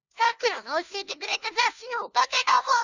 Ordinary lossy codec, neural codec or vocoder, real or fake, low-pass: none; codec, 16 kHz, 1 kbps, FunCodec, trained on LibriTTS, 50 frames a second; fake; 7.2 kHz